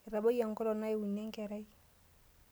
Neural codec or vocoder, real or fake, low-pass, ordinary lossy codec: none; real; none; none